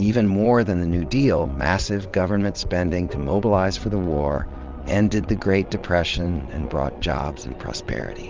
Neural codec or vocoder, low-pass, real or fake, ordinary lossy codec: vocoder, 22.05 kHz, 80 mel bands, WaveNeXt; 7.2 kHz; fake; Opus, 32 kbps